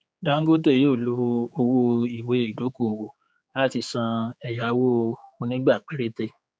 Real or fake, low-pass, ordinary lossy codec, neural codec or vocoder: fake; none; none; codec, 16 kHz, 4 kbps, X-Codec, HuBERT features, trained on general audio